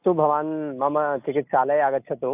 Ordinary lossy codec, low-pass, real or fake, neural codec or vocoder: AAC, 32 kbps; 3.6 kHz; real; none